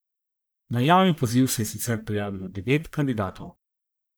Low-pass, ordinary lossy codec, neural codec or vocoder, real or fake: none; none; codec, 44.1 kHz, 1.7 kbps, Pupu-Codec; fake